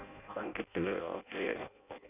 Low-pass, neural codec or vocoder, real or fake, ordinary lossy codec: 3.6 kHz; codec, 16 kHz in and 24 kHz out, 0.6 kbps, FireRedTTS-2 codec; fake; none